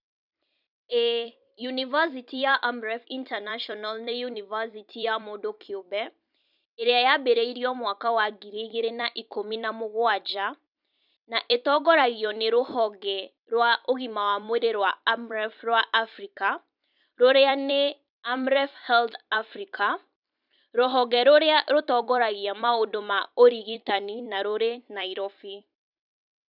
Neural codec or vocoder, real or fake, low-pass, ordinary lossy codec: vocoder, 44.1 kHz, 128 mel bands every 256 samples, BigVGAN v2; fake; 5.4 kHz; none